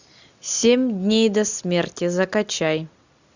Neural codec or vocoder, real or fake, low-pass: none; real; 7.2 kHz